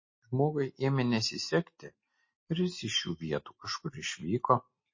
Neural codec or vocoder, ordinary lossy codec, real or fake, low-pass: none; MP3, 32 kbps; real; 7.2 kHz